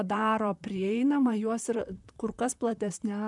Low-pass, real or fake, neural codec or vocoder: 10.8 kHz; fake; vocoder, 44.1 kHz, 128 mel bands, Pupu-Vocoder